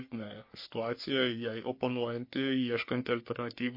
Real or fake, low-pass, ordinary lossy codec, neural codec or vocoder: fake; 5.4 kHz; MP3, 32 kbps; codec, 44.1 kHz, 3.4 kbps, Pupu-Codec